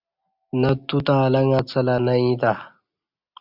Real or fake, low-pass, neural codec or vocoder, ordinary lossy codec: real; 5.4 kHz; none; AAC, 48 kbps